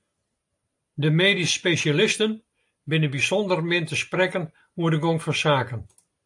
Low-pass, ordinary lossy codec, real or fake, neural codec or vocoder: 10.8 kHz; AAC, 64 kbps; real; none